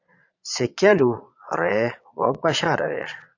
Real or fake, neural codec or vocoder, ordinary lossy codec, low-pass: fake; vocoder, 22.05 kHz, 80 mel bands, Vocos; AAC, 48 kbps; 7.2 kHz